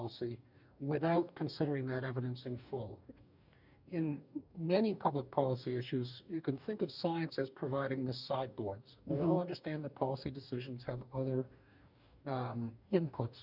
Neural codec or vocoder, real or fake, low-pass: codec, 44.1 kHz, 2.6 kbps, DAC; fake; 5.4 kHz